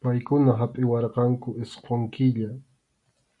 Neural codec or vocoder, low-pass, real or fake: none; 10.8 kHz; real